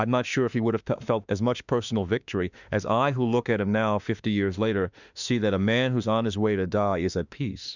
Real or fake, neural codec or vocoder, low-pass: fake; autoencoder, 48 kHz, 32 numbers a frame, DAC-VAE, trained on Japanese speech; 7.2 kHz